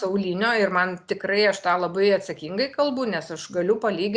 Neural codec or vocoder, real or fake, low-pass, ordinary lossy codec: none; real; 9.9 kHz; Opus, 64 kbps